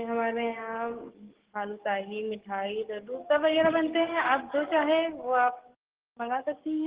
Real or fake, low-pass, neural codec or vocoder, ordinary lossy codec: fake; 3.6 kHz; codec, 44.1 kHz, 7.8 kbps, DAC; Opus, 16 kbps